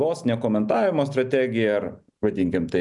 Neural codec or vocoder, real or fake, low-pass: none; real; 10.8 kHz